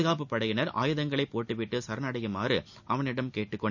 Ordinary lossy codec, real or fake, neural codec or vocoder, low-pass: none; real; none; 7.2 kHz